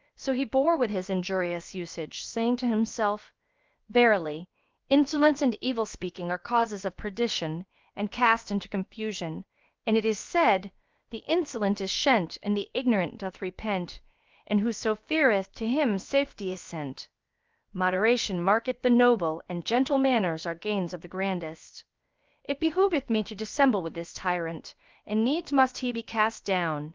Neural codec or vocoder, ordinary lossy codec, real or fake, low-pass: codec, 16 kHz, about 1 kbps, DyCAST, with the encoder's durations; Opus, 24 kbps; fake; 7.2 kHz